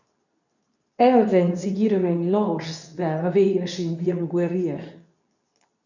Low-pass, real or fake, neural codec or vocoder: 7.2 kHz; fake; codec, 24 kHz, 0.9 kbps, WavTokenizer, medium speech release version 2